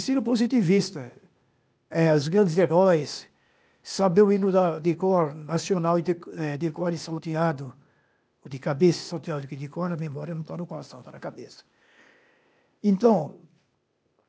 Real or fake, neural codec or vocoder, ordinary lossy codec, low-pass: fake; codec, 16 kHz, 0.8 kbps, ZipCodec; none; none